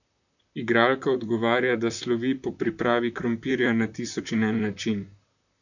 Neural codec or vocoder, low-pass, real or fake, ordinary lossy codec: vocoder, 44.1 kHz, 128 mel bands, Pupu-Vocoder; 7.2 kHz; fake; none